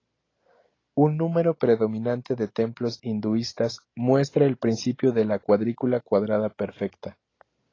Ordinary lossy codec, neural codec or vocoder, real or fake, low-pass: AAC, 32 kbps; none; real; 7.2 kHz